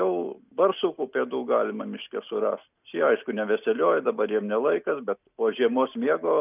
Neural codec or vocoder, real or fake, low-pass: none; real; 3.6 kHz